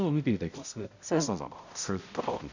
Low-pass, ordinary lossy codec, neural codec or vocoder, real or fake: 7.2 kHz; none; codec, 16 kHz, 0.5 kbps, X-Codec, HuBERT features, trained on balanced general audio; fake